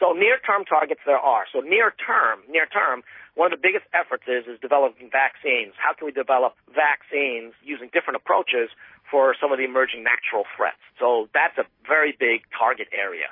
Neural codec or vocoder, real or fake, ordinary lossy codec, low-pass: none; real; MP3, 24 kbps; 5.4 kHz